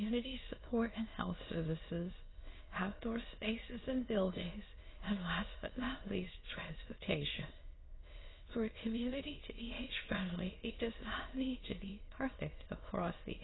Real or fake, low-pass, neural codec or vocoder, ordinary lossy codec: fake; 7.2 kHz; autoencoder, 22.05 kHz, a latent of 192 numbers a frame, VITS, trained on many speakers; AAC, 16 kbps